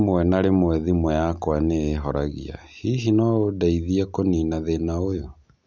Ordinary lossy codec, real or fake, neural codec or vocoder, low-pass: none; real; none; 7.2 kHz